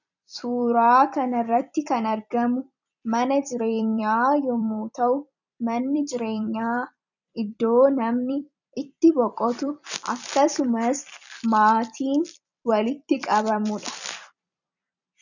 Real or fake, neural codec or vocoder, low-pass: fake; vocoder, 44.1 kHz, 128 mel bands every 256 samples, BigVGAN v2; 7.2 kHz